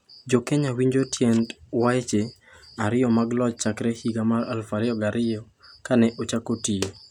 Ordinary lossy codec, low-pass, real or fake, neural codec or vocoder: none; 19.8 kHz; real; none